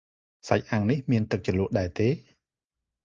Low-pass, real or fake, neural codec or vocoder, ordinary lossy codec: 7.2 kHz; real; none; Opus, 24 kbps